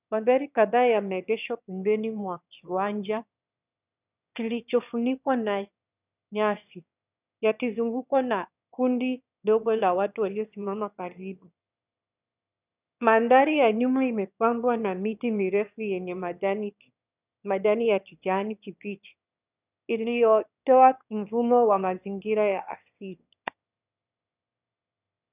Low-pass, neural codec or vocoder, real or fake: 3.6 kHz; autoencoder, 22.05 kHz, a latent of 192 numbers a frame, VITS, trained on one speaker; fake